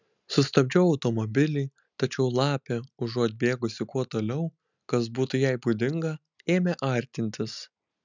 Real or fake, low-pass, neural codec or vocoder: real; 7.2 kHz; none